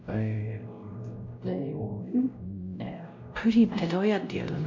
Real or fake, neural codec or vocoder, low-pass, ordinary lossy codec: fake; codec, 16 kHz, 0.5 kbps, X-Codec, WavLM features, trained on Multilingual LibriSpeech; 7.2 kHz; MP3, 48 kbps